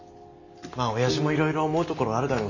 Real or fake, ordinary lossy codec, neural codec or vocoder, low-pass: real; none; none; 7.2 kHz